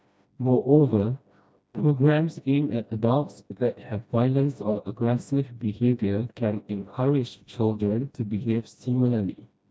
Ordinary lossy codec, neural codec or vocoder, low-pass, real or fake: none; codec, 16 kHz, 1 kbps, FreqCodec, smaller model; none; fake